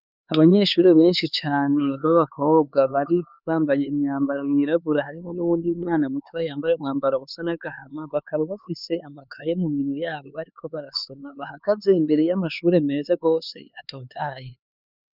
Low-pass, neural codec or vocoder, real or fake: 5.4 kHz; codec, 16 kHz, 4 kbps, X-Codec, HuBERT features, trained on LibriSpeech; fake